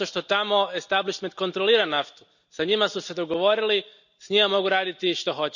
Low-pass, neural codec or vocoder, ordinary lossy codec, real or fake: 7.2 kHz; none; none; real